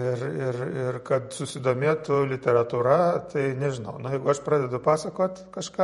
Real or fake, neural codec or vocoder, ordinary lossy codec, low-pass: fake; vocoder, 48 kHz, 128 mel bands, Vocos; MP3, 48 kbps; 19.8 kHz